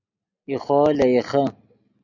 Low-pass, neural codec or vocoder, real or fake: 7.2 kHz; none; real